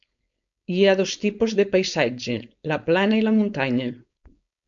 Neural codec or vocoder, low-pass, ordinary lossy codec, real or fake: codec, 16 kHz, 4.8 kbps, FACodec; 7.2 kHz; MP3, 64 kbps; fake